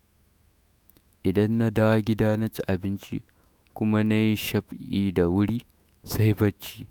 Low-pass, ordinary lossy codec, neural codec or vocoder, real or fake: none; none; autoencoder, 48 kHz, 128 numbers a frame, DAC-VAE, trained on Japanese speech; fake